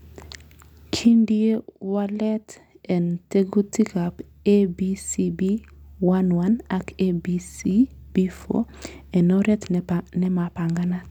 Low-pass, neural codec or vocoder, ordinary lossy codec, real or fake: 19.8 kHz; none; none; real